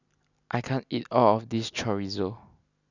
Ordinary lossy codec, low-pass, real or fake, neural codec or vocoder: none; 7.2 kHz; real; none